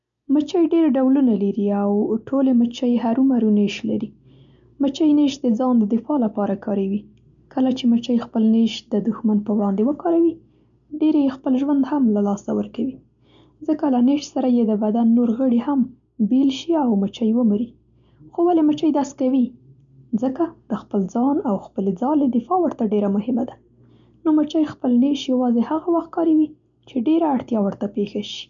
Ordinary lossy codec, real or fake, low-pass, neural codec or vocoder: none; real; 7.2 kHz; none